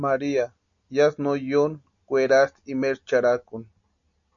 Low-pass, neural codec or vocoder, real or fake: 7.2 kHz; none; real